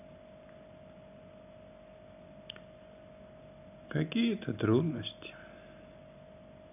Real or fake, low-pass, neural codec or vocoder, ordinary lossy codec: real; 3.6 kHz; none; none